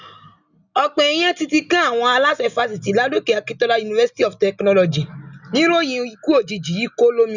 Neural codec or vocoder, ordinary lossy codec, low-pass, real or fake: none; none; 7.2 kHz; real